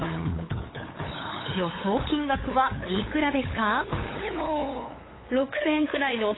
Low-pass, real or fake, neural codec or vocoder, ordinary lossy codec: 7.2 kHz; fake; codec, 16 kHz, 8 kbps, FunCodec, trained on LibriTTS, 25 frames a second; AAC, 16 kbps